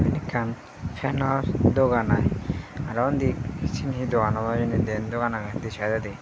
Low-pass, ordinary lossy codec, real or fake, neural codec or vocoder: none; none; real; none